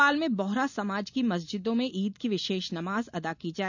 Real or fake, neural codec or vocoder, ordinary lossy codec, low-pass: real; none; none; 7.2 kHz